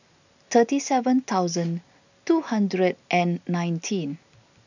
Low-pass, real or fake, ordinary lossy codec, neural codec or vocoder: 7.2 kHz; real; none; none